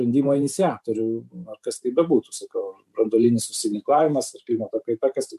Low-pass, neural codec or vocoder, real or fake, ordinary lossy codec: 14.4 kHz; vocoder, 44.1 kHz, 128 mel bands every 512 samples, BigVGAN v2; fake; MP3, 96 kbps